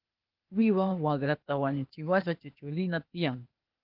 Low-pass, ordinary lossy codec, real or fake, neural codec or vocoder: 5.4 kHz; Opus, 24 kbps; fake; codec, 16 kHz, 0.8 kbps, ZipCodec